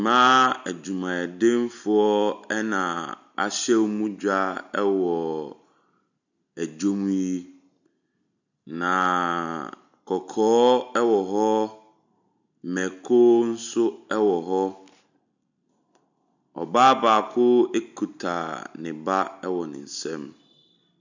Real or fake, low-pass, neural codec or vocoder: real; 7.2 kHz; none